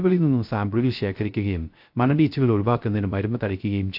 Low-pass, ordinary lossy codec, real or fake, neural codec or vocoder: 5.4 kHz; AAC, 48 kbps; fake; codec, 16 kHz, 0.3 kbps, FocalCodec